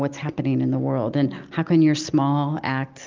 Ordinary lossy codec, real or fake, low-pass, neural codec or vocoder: Opus, 24 kbps; real; 7.2 kHz; none